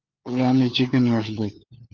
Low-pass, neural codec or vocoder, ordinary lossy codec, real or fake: 7.2 kHz; codec, 16 kHz, 4 kbps, FunCodec, trained on LibriTTS, 50 frames a second; Opus, 24 kbps; fake